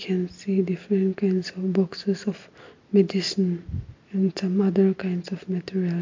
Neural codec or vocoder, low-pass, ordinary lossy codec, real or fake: none; 7.2 kHz; MP3, 48 kbps; real